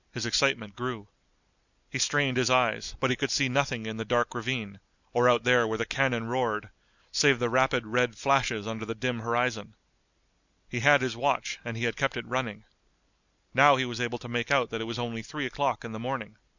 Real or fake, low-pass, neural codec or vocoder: real; 7.2 kHz; none